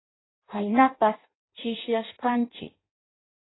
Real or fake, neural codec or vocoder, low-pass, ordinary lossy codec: fake; codec, 16 kHz in and 24 kHz out, 0.6 kbps, FireRedTTS-2 codec; 7.2 kHz; AAC, 16 kbps